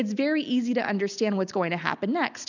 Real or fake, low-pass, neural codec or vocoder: real; 7.2 kHz; none